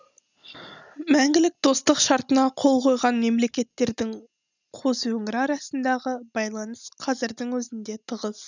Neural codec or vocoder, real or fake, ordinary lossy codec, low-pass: none; real; none; 7.2 kHz